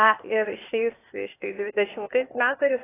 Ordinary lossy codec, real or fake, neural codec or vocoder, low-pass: AAC, 16 kbps; fake; codec, 16 kHz, 0.8 kbps, ZipCodec; 3.6 kHz